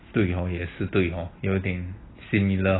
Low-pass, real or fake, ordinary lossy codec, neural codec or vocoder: 7.2 kHz; real; AAC, 16 kbps; none